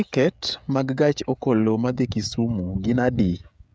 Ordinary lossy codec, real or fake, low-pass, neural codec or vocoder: none; fake; none; codec, 16 kHz, 16 kbps, FreqCodec, smaller model